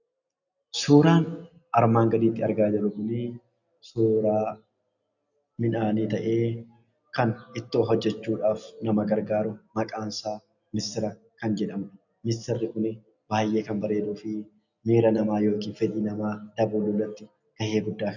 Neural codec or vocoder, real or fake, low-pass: none; real; 7.2 kHz